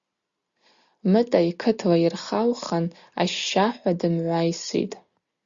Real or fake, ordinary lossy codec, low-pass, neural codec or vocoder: real; Opus, 64 kbps; 7.2 kHz; none